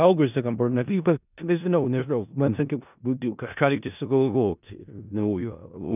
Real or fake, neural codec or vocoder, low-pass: fake; codec, 16 kHz in and 24 kHz out, 0.4 kbps, LongCat-Audio-Codec, four codebook decoder; 3.6 kHz